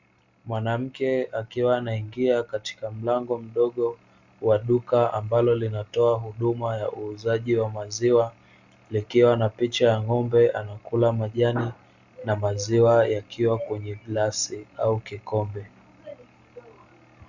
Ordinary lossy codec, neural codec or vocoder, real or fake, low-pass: Opus, 64 kbps; none; real; 7.2 kHz